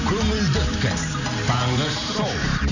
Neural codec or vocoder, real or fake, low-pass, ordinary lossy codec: none; real; 7.2 kHz; none